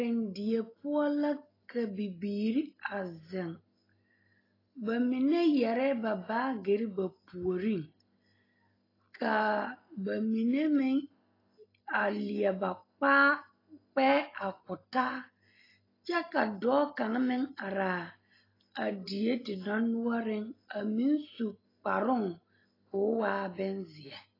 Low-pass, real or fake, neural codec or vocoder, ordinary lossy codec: 5.4 kHz; real; none; AAC, 24 kbps